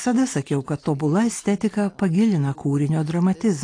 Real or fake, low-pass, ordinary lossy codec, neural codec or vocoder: real; 9.9 kHz; AAC, 48 kbps; none